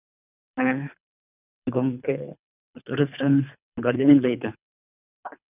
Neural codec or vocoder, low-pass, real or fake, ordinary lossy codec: codec, 24 kHz, 3 kbps, HILCodec; 3.6 kHz; fake; none